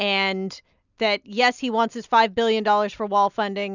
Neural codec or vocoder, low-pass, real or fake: none; 7.2 kHz; real